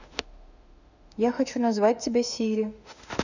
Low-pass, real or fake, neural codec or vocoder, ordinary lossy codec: 7.2 kHz; fake; autoencoder, 48 kHz, 32 numbers a frame, DAC-VAE, trained on Japanese speech; none